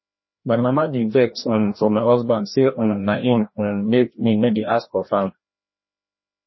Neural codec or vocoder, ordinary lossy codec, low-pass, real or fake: codec, 16 kHz, 1 kbps, FreqCodec, larger model; MP3, 24 kbps; 7.2 kHz; fake